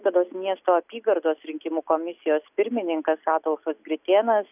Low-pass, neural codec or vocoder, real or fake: 3.6 kHz; none; real